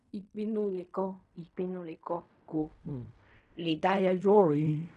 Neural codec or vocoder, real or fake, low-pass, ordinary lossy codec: codec, 16 kHz in and 24 kHz out, 0.4 kbps, LongCat-Audio-Codec, fine tuned four codebook decoder; fake; 10.8 kHz; none